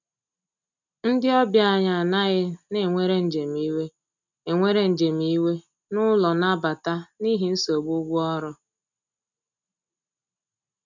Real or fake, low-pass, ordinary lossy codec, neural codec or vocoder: real; 7.2 kHz; none; none